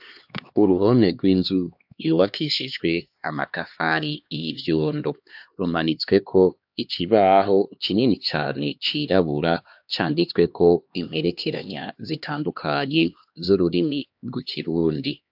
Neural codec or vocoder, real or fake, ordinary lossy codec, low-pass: codec, 16 kHz, 2 kbps, X-Codec, HuBERT features, trained on LibriSpeech; fake; AAC, 48 kbps; 5.4 kHz